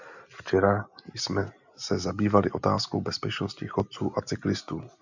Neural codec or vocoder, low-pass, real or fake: none; 7.2 kHz; real